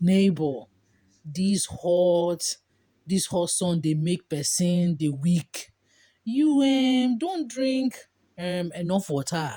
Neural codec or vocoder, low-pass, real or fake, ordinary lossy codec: vocoder, 48 kHz, 128 mel bands, Vocos; none; fake; none